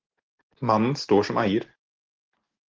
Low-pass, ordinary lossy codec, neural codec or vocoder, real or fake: 7.2 kHz; Opus, 16 kbps; none; real